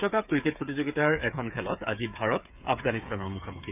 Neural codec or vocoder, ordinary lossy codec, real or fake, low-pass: codec, 16 kHz, 8 kbps, FreqCodec, smaller model; none; fake; 3.6 kHz